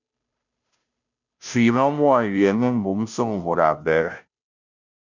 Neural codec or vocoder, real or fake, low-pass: codec, 16 kHz, 0.5 kbps, FunCodec, trained on Chinese and English, 25 frames a second; fake; 7.2 kHz